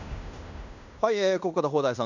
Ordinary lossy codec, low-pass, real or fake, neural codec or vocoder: none; 7.2 kHz; fake; codec, 16 kHz in and 24 kHz out, 0.9 kbps, LongCat-Audio-Codec, fine tuned four codebook decoder